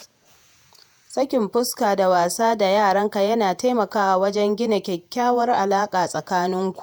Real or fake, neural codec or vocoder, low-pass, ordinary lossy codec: fake; vocoder, 48 kHz, 128 mel bands, Vocos; none; none